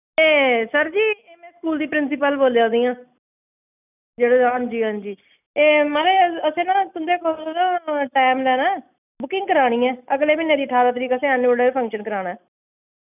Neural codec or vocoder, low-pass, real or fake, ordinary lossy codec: none; 3.6 kHz; real; none